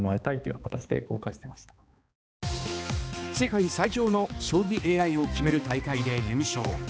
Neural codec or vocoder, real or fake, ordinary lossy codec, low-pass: codec, 16 kHz, 2 kbps, X-Codec, HuBERT features, trained on balanced general audio; fake; none; none